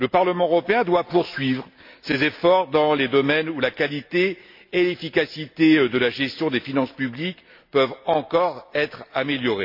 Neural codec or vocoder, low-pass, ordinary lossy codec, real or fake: none; 5.4 kHz; MP3, 32 kbps; real